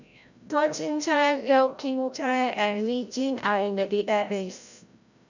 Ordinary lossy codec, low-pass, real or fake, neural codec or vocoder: none; 7.2 kHz; fake; codec, 16 kHz, 0.5 kbps, FreqCodec, larger model